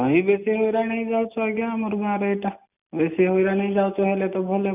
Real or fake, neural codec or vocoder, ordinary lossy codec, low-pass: real; none; none; 3.6 kHz